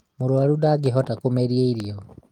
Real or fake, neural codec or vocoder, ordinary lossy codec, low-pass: real; none; Opus, 24 kbps; 19.8 kHz